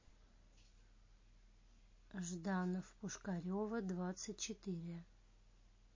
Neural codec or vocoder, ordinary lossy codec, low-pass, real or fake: none; MP3, 32 kbps; 7.2 kHz; real